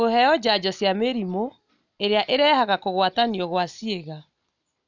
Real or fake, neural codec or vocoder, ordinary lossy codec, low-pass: real; none; none; 7.2 kHz